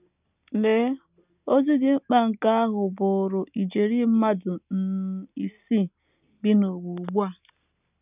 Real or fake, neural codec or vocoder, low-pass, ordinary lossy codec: real; none; 3.6 kHz; none